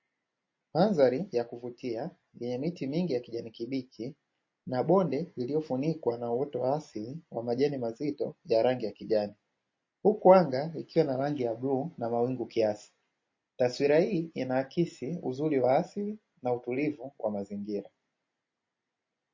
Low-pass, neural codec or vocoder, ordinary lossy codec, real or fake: 7.2 kHz; none; MP3, 32 kbps; real